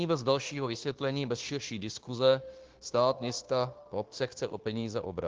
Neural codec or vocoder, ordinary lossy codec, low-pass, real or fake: codec, 16 kHz, 0.9 kbps, LongCat-Audio-Codec; Opus, 16 kbps; 7.2 kHz; fake